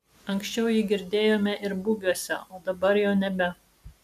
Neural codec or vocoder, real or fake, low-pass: none; real; 14.4 kHz